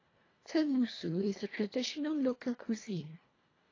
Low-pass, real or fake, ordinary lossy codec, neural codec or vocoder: 7.2 kHz; fake; AAC, 32 kbps; codec, 24 kHz, 1.5 kbps, HILCodec